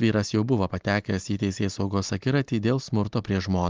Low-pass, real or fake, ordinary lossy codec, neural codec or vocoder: 7.2 kHz; real; Opus, 32 kbps; none